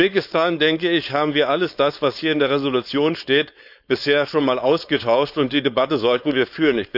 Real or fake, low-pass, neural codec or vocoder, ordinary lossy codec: fake; 5.4 kHz; codec, 16 kHz, 4.8 kbps, FACodec; none